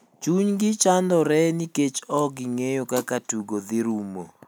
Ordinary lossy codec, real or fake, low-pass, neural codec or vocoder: none; real; none; none